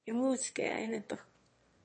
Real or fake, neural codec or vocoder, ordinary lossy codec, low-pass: fake; autoencoder, 22.05 kHz, a latent of 192 numbers a frame, VITS, trained on one speaker; MP3, 32 kbps; 9.9 kHz